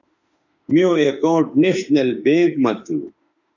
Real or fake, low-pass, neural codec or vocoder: fake; 7.2 kHz; autoencoder, 48 kHz, 32 numbers a frame, DAC-VAE, trained on Japanese speech